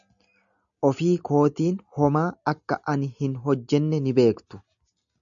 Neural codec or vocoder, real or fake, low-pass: none; real; 7.2 kHz